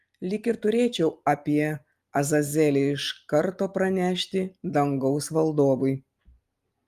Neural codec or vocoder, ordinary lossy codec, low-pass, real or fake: none; Opus, 32 kbps; 14.4 kHz; real